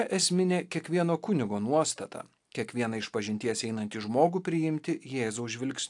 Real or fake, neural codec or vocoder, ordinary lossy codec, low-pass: real; none; AAC, 64 kbps; 10.8 kHz